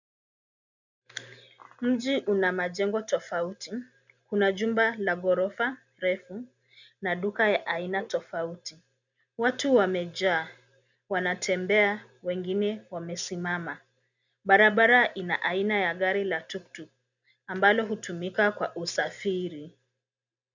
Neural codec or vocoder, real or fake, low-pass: none; real; 7.2 kHz